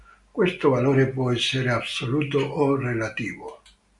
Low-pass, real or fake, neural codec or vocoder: 10.8 kHz; real; none